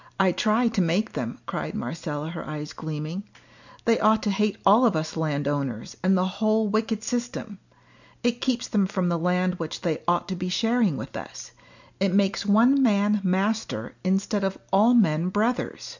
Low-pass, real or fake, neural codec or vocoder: 7.2 kHz; real; none